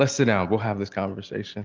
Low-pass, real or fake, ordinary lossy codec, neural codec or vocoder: 7.2 kHz; real; Opus, 24 kbps; none